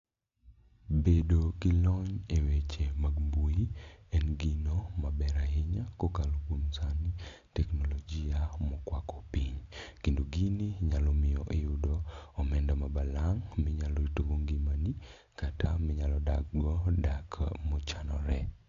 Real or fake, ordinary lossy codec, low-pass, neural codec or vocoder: real; AAC, 48 kbps; 7.2 kHz; none